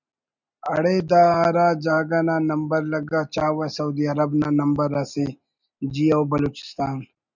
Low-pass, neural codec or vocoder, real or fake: 7.2 kHz; none; real